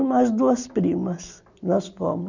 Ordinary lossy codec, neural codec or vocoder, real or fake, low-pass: none; none; real; 7.2 kHz